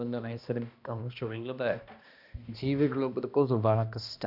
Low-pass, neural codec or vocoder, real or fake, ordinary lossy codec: 5.4 kHz; codec, 16 kHz, 1 kbps, X-Codec, HuBERT features, trained on balanced general audio; fake; none